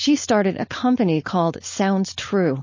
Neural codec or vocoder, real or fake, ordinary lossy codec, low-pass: codec, 16 kHz, 4.8 kbps, FACodec; fake; MP3, 32 kbps; 7.2 kHz